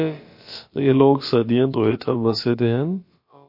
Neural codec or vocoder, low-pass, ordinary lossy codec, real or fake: codec, 16 kHz, about 1 kbps, DyCAST, with the encoder's durations; 5.4 kHz; MP3, 32 kbps; fake